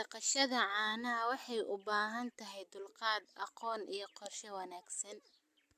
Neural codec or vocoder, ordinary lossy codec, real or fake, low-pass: none; AAC, 96 kbps; real; 14.4 kHz